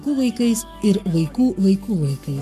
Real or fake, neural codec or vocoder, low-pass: fake; codec, 44.1 kHz, 7.8 kbps, Pupu-Codec; 14.4 kHz